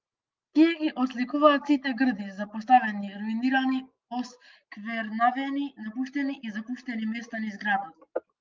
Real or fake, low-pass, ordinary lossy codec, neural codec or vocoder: real; 7.2 kHz; Opus, 32 kbps; none